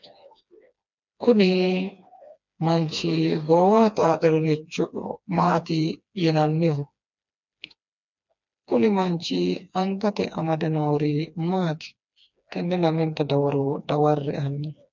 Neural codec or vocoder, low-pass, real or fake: codec, 16 kHz, 2 kbps, FreqCodec, smaller model; 7.2 kHz; fake